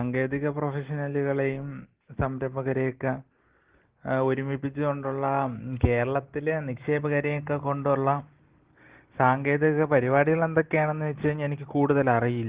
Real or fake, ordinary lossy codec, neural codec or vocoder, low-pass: real; Opus, 16 kbps; none; 3.6 kHz